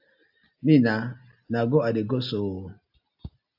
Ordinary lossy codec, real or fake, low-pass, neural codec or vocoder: AAC, 48 kbps; real; 5.4 kHz; none